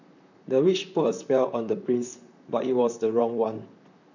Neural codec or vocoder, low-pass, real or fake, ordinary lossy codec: vocoder, 44.1 kHz, 128 mel bands, Pupu-Vocoder; 7.2 kHz; fake; none